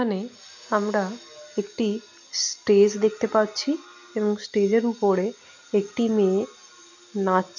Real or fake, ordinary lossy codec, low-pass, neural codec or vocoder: real; none; 7.2 kHz; none